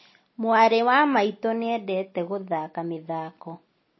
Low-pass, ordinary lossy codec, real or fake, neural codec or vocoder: 7.2 kHz; MP3, 24 kbps; real; none